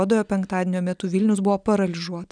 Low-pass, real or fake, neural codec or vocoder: 9.9 kHz; real; none